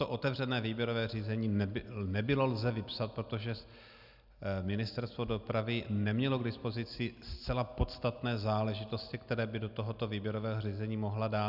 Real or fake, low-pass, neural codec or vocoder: real; 5.4 kHz; none